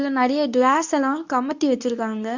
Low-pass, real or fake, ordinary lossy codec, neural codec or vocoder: 7.2 kHz; fake; none; codec, 24 kHz, 0.9 kbps, WavTokenizer, medium speech release version 2